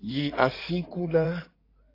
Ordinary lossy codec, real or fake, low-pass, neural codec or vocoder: AAC, 32 kbps; fake; 5.4 kHz; codec, 16 kHz in and 24 kHz out, 2.2 kbps, FireRedTTS-2 codec